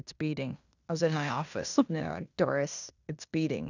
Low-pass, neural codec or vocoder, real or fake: 7.2 kHz; codec, 16 kHz in and 24 kHz out, 0.9 kbps, LongCat-Audio-Codec, fine tuned four codebook decoder; fake